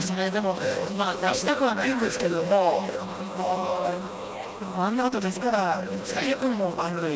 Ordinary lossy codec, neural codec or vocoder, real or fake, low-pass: none; codec, 16 kHz, 1 kbps, FreqCodec, smaller model; fake; none